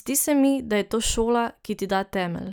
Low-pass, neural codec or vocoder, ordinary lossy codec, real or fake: none; none; none; real